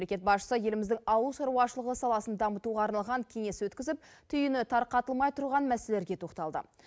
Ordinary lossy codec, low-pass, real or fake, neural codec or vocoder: none; none; real; none